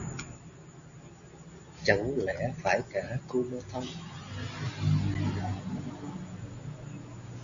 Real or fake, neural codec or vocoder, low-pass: real; none; 7.2 kHz